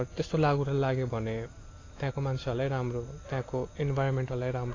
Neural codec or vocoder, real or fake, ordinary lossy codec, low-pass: none; real; AAC, 32 kbps; 7.2 kHz